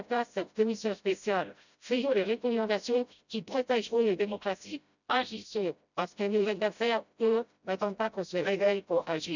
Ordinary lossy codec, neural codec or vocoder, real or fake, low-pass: none; codec, 16 kHz, 0.5 kbps, FreqCodec, smaller model; fake; 7.2 kHz